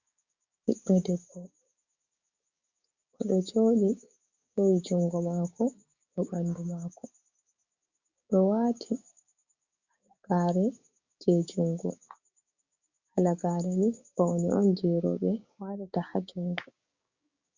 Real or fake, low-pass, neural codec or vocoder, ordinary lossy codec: fake; 7.2 kHz; codec, 16 kHz, 6 kbps, DAC; Opus, 64 kbps